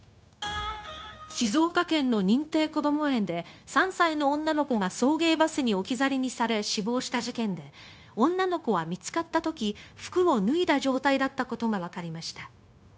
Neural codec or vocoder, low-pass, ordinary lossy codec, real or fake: codec, 16 kHz, 0.9 kbps, LongCat-Audio-Codec; none; none; fake